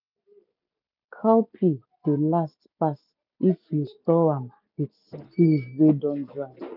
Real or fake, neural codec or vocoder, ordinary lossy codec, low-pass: real; none; none; 5.4 kHz